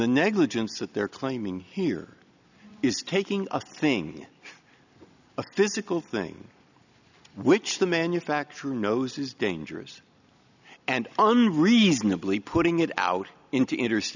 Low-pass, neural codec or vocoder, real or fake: 7.2 kHz; none; real